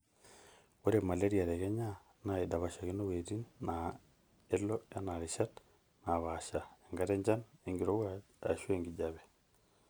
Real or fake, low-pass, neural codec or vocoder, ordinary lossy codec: real; none; none; none